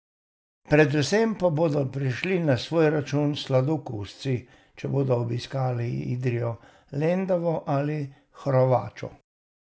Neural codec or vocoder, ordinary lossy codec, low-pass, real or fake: none; none; none; real